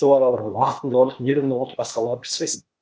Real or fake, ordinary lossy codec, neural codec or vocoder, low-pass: fake; none; codec, 16 kHz, 0.8 kbps, ZipCodec; none